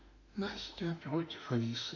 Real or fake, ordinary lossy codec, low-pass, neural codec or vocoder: fake; none; 7.2 kHz; autoencoder, 48 kHz, 32 numbers a frame, DAC-VAE, trained on Japanese speech